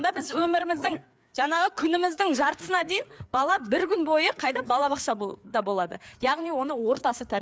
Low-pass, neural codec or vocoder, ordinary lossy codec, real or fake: none; codec, 16 kHz, 4 kbps, FreqCodec, larger model; none; fake